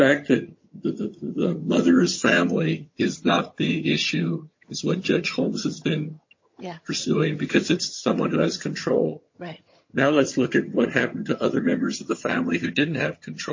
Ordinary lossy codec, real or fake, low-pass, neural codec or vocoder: MP3, 32 kbps; fake; 7.2 kHz; vocoder, 22.05 kHz, 80 mel bands, HiFi-GAN